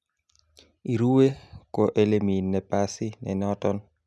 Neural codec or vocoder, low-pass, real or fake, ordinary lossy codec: none; none; real; none